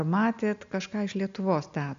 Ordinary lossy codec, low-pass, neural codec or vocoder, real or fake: MP3, 64 kbps; 7.2 kHz; none; real